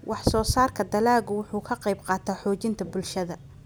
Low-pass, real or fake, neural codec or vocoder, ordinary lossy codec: none; real; none; none